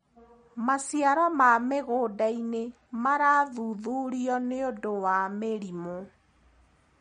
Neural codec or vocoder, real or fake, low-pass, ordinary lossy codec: none; real; 9.9 kHz; MP3, 48 kbps